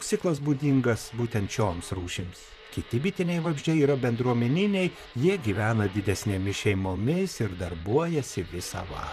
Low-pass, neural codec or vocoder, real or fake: 14.4 kHz; vocoder, 44.1 kHz, 128 mel bands, Pupu-Vocoder; fake